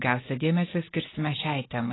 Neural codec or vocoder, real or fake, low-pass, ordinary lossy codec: none; real; 7.2 kHz; AAC, 16 kbps